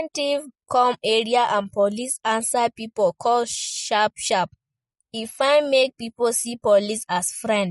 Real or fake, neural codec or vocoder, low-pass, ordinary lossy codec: real; none; 19.8 kHz; MP3, 48 kbps